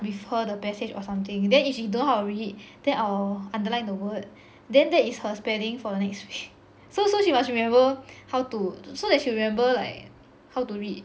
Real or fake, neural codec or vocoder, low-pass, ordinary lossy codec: real; none; none; none